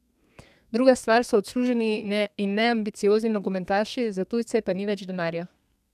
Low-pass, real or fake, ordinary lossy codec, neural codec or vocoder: 14.4 kHz; fake; none; codec, 32 kHz, 1.9 kbps, SNAC